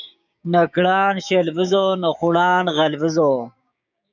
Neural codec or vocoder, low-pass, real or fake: codec, 16 kHz, 6 kbps, DAC; 7.2 kHz; fake